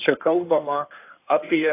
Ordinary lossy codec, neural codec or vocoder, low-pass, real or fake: AAC, 24 kbps; codec, 16 kHz in and 24 kHz out, 2.2 kbps, FireRedTTS-2 codec; 3.6 kHz; fake